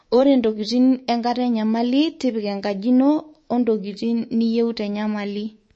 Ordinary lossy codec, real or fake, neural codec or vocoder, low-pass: MP3, 32 kbps; fake; codec, 24 kHz, 3.1 kbps, DualCodec; 9.9 kHz